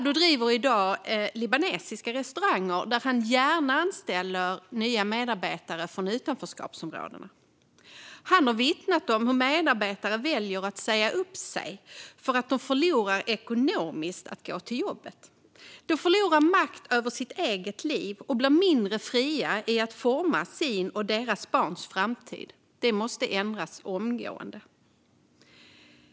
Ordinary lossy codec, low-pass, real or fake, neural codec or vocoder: none; none; real; none